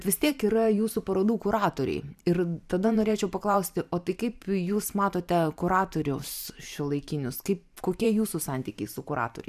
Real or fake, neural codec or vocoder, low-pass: fake; vocoder, 44.1 kHz, 128 mel bands every 512 samples, BigVGAN v2; 14.4 kHz